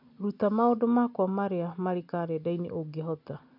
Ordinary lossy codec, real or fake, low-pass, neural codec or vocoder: none; real; 5.4 kHz; none